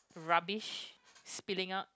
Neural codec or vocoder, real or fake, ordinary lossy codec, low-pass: none; real; none; none